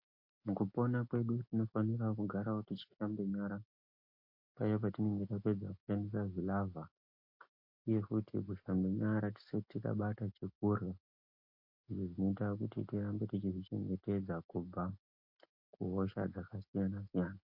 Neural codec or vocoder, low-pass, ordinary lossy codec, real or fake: none; 5.4 kHz; MP3, 32 kbps; real